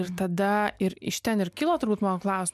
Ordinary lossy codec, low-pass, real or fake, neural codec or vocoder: MP3, 96 kbps; 14.4 kHz; real; none